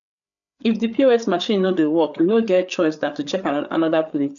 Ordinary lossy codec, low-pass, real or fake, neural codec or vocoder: none; 7.2 kHz; fake; codec, 16 kHz, 8 kbps, FreqCodec, larger model